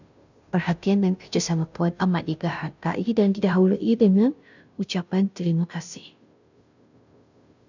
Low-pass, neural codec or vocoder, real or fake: 7.2 kHz; codec, 16 kHz, 0.5 kbps, FunCodec, trained on Chinese and English, 25 frames a second; fake